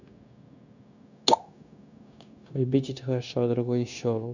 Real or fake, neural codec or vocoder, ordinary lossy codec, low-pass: fake; codec, 16 kHz, 0.9 kbps, LongCat-Audio-Codec; none; 7.2 kHz